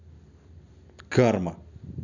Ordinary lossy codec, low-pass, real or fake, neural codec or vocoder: none; 7.2 kHz; real; none